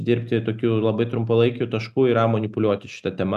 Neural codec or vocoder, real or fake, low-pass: none; real; 14.4 kHz